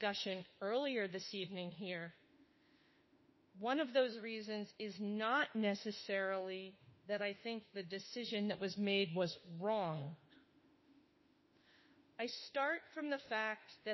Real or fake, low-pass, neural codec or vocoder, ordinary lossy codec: fake; 7.2 kHz; autoencoder, 48 kHz, 32 numbers a frame, DAC-VAE, trained on Japanese speech; MP3, 24 kbps